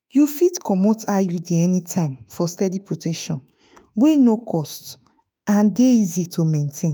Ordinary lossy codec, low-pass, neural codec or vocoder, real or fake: none; none; autoencoder, 48 kHz, 32 numbers a frame, DAC-VAE, trained on Japanese speech; fake